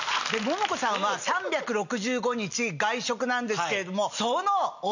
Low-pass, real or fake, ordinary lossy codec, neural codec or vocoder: 7.2 kHz; real; none; none